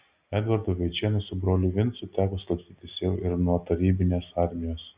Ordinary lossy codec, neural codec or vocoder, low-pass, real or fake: Opus, 64 kbps; none; 3.6 kHz; real